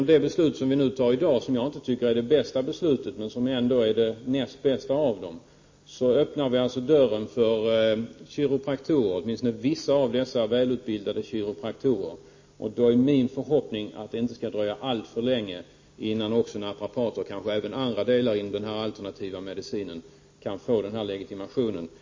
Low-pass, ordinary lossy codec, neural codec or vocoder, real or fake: 7.2 kHz; MP3, 32 kbps; none; real